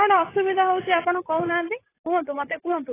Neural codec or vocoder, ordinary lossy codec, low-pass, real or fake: vocoder, 44.1 kHz, 128 mel bands, Pupu-Vocoder; AAC, 16 kbps; 3.6 kHz; fake